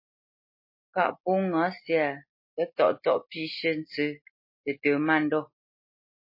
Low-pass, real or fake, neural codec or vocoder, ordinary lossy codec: 5.4 kHz; real; none; MP3, 24 kbps